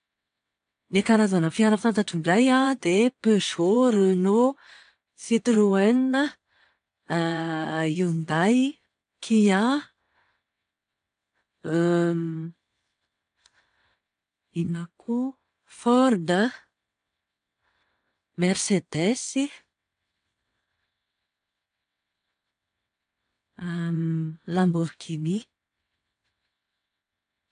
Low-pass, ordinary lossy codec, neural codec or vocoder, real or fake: 9.9 kHz; none; vocoder, 22.05 kHz, 80 mel bands, WaveNeXt; fake